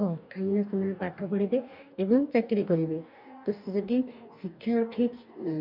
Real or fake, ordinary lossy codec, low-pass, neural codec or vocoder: fake; none; 5.4 kHz; codec, 44.1 kHz, 2.6 kbps, DAC